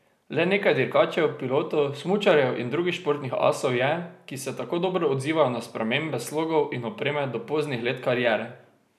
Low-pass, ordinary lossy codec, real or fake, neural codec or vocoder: 14.4 kHz; none; real; none